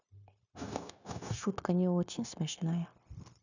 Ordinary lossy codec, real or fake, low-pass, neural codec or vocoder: none; fake; 7.2 kHz; codec, 16 kHz, 0.9 kbps, LongCat-Audio-Codec